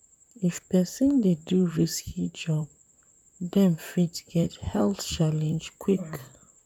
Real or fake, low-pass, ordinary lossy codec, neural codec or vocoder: fake; 19.8 kHz; none; vocoder, 44.1 kHz, 128 mel bands, Pupu-Vocoder